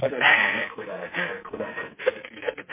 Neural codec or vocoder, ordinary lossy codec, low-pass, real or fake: codec, 44.1 kHz, 2.6 kbps, SNAC; MP3, 24 kbps; 3.6 kHz; fake